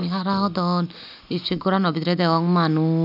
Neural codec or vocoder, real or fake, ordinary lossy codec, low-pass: none; real; none; 5.4 kHz